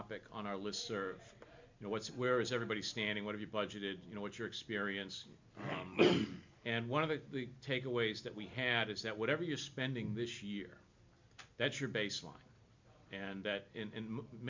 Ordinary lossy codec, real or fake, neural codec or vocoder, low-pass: MP3, 64 kbps; real; none; 7.2 kHz